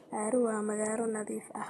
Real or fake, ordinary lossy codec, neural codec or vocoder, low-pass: real; AAC, 32 kbps; none; 19.8 kHz